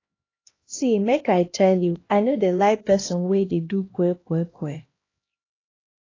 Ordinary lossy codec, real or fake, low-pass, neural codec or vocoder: AAC, 32 kbps; fake; 7.2 kHz; codec, 16 kHz, 1 kbps, X-Codec, HuBERT features, trained on LibriSpeech